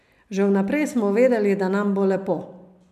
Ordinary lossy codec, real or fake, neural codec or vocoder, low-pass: none; real; none; 14.4 kHz